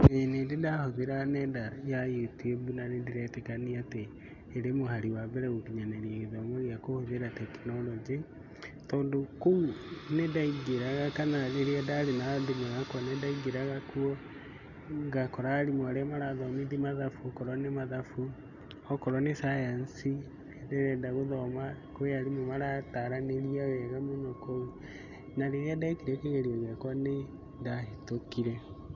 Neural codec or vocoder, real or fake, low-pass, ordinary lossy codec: none; real; 7.2 kHz; none